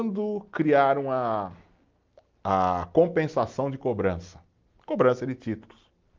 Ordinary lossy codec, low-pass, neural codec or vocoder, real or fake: Opus, 32 kbps; 7.2 kHz; none; real